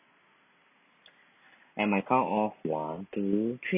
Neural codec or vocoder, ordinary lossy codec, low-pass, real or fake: none; AAC, 32 kbps; 3.6 kHz; real